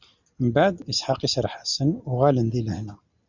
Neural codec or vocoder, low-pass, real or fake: none; 7.2 kHz; real